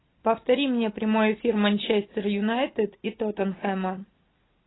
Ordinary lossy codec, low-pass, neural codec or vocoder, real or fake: AAC, 16 kbps; 7.2 kHz; none; real